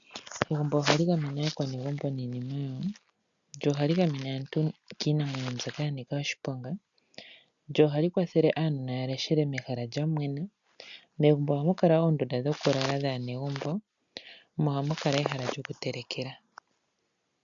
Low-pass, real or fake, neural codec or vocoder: 7.2 kHz; real; none